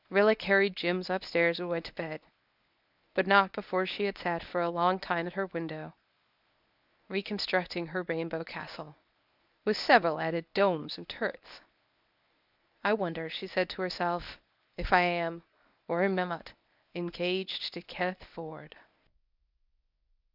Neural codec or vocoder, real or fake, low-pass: codec, 24 kHz, 0.9 kbps, WavTokenizer, medium speech release version 1; fake; 5.4 kHz